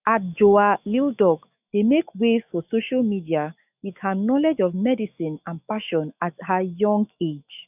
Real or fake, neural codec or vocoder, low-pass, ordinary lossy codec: fake; autoencoder, 48 kHz, 128 numbers a frame, DAC-VAE, trained on Japanese speech; 3.6 kHz; none